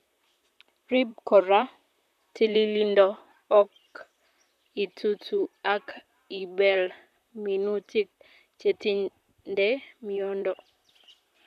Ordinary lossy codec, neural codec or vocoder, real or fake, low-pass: none; vocoder, 44.1 kHz, 128 mel bands, Pupu-Vocoder; fake; 14.4 kHz